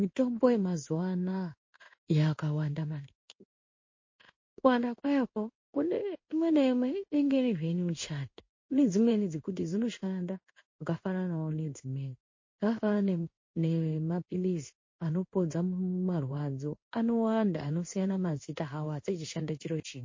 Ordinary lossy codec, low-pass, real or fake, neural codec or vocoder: MP3, 32 kbps; 7.2 kHz; fake; codec, 16 kHz in and 24 kHz out, 1 kbps, XY-Tokenizer